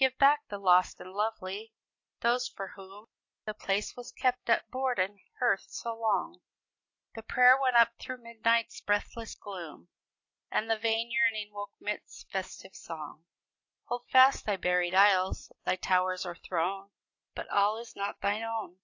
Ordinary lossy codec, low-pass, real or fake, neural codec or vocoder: AAC, 48 kbps; 7.2 kHz; real; none